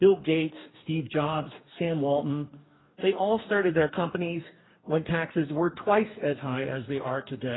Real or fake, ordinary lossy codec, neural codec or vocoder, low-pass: fake; AAC, 16 kbps; codec, 44.1 kHz, 2.6 kbps, DAC; 7.2 kHz